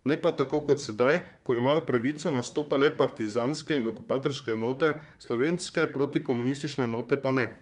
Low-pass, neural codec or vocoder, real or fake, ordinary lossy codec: 10.8 kHz; codec, 24 kHz, 1 kbps, SNAC; fake; none